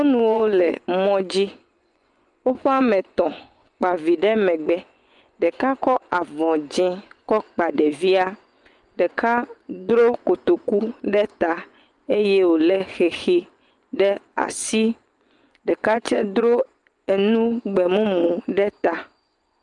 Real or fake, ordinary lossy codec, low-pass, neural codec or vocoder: fake; Opus, 32 kbps; 10.8 kHz; vocoder, 24 kHz, 100 mel bands, Vocos